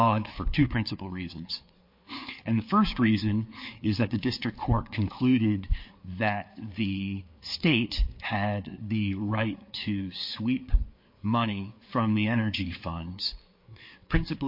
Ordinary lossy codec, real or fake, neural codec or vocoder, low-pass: MP3, 32 kbps; fake; codec, 16 kHz, 4 kbps, FreqCodec, larger model; 5.4 kHz